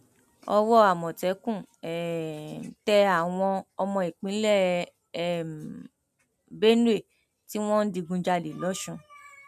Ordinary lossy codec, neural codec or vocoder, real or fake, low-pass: MP3, 96 kbps; none; real; 14.4 kHz